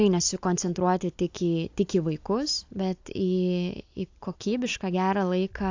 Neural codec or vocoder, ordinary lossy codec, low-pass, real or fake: none; AAC, 48 kbps; 7.2 kHz; real